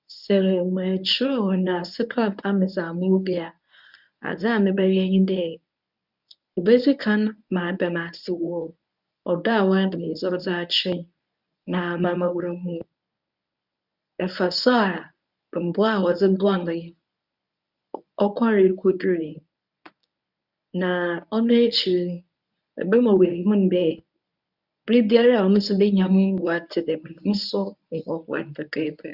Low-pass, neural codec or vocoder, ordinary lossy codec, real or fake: 5.4 kHz; codec, 24 kHz, 0.9 kbps, WavTokenizer, medium speech release version 1; none; fake